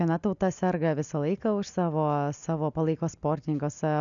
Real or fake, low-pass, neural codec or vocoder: real; 7.2 kHz; none